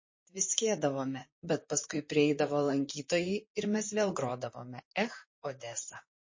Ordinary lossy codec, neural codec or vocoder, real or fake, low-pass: MP3, 32 kbps; vocoder, 44.1 kHz, 128 mel bands, Pupu-Vocoder; fake; 7.2 kHz